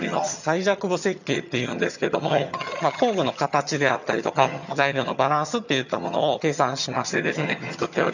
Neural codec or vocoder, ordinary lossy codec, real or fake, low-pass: vocoder, 22.05 kHz, 80 mel bands, HiFi-GAN; none; fake; 7.2 kHz